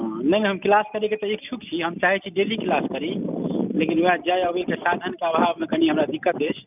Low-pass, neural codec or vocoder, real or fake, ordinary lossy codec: 3.6 kHz; none; real; none